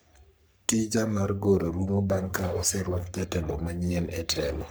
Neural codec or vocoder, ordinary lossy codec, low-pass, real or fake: codec, 44.1 kHz, 3.4 kbps, Pupu-Codec; none; none; fake